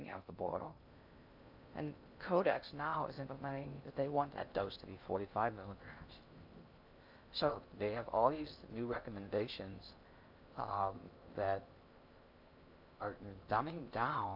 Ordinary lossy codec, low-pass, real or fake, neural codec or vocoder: MP3, 48 kbps; 5.4 kHz; fake; codec, 16 kHz in and 24 kHz out, 0.6 kbps, FocalCodec, streaming, 2048 codes